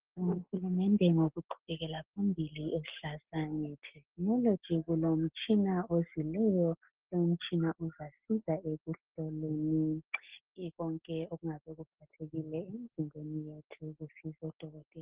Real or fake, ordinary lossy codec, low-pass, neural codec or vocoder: real; Opus, 16 kbps; 3.6 kHz; none